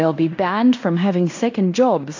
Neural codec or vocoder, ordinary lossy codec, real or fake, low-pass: codec, 16 kHz in and 24 kHz out, 0.9 kbps, LongCat-Audio-Codec, fine tuned four codebook decoder; AAC, 48 kbps; fake; 7.2 kHz